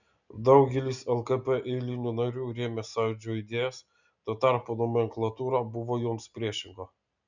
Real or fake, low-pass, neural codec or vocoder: real; 7.2 kHz; none